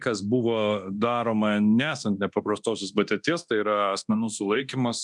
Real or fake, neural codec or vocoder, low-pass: fake; codec, 24 kHz, 0.9 kbps, DualCodec; 10.8 kHz